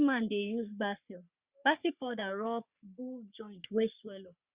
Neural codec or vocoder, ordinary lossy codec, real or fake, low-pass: codec, 44.1 kHz, 3.4 kbps, Pupu-Codec; Opus, 64 kbps; fake; 3.6 kHz